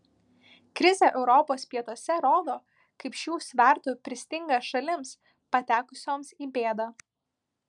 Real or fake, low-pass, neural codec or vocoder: real; 10.8 kHz; none